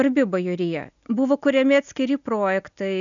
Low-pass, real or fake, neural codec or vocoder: 7.2 kHz; real; none